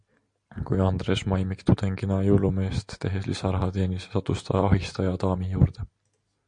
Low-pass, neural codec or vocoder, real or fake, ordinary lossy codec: 9.9 kHz; none; real; MP3, 64 kbps